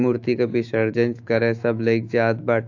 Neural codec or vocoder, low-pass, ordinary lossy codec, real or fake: autoencoder, 48 kHz, 128 numbers a frame, DAC-VAE, trained on Japanese speech; 7.2 kHz; AAC, 48 kbps; fake